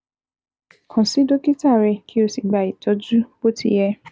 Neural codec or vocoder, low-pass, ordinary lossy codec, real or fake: none; none; none; real